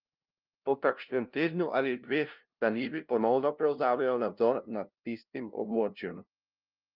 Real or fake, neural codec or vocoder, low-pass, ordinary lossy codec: fake; codec, 16 kHz, 0.5 kbps, FunCodec, trained on LibriTTS, 25 frames a second; 5.4 kHz; Opus, 24 kbps